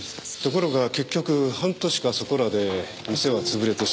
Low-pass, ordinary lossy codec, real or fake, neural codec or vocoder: none; none; real; none